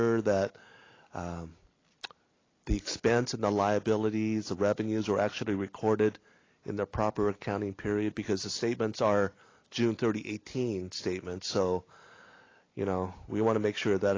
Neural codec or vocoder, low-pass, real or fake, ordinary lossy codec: none; 7.2 kHz; real; AAC, 32 kbps